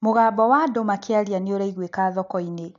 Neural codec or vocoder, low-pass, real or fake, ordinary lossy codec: none; 7.2 kHz; real; AAC, 64 kbps